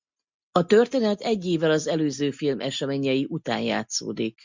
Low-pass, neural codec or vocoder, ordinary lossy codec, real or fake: 7.2 kHz; none; MP3, 64 kbps; real